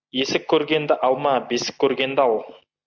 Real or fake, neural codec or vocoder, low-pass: real; none; 7.2 kHz